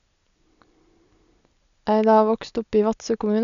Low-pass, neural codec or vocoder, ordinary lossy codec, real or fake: 7.2 kHz; none; none; real